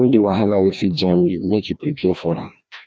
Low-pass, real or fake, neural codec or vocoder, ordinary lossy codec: none; fake; codec, 16 kHz, 1 kbps, FreqCodec, larger model; none